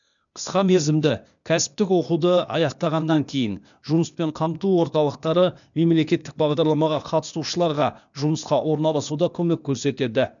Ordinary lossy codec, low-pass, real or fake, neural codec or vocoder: none; 7.2 kHz; fake; codec, 16 kHz, 0.8 kbps, ZipCodec